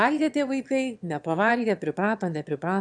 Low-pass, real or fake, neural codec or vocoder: 9.9 kHz; fake; autoencoder, 22.05 kHz, a latent of 192 numbers a frame, VITS, trained on one speaker